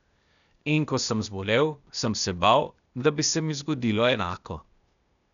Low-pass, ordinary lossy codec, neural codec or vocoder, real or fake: 7.2 kHz; none; codec, 16 kHz, 0.8 kbps, ZipCodec; fake